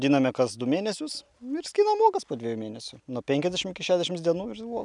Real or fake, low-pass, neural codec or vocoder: real; 10.8 kHz; none